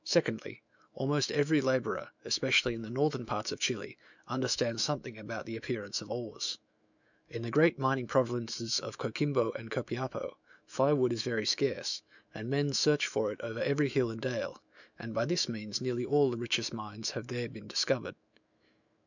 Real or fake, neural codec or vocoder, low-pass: fake; autoencoder, 48 kHz, 128 numbers a frame, DAC-VAE, trained on Japanese speech; 7.2 kHz